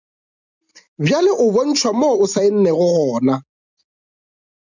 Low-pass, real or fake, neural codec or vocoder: 7.2 kHz; real; none